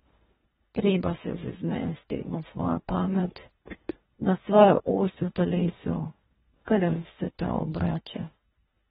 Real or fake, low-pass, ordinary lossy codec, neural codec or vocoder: fake; 10.8 kHz; AAC, 16 kbps; codec, 24 kHz, 1.5 kbps, HILCodec